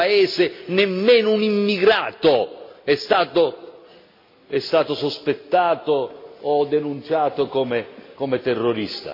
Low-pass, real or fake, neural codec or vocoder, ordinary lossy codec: 5.4 kHz; real; none; MP3, 32 kbps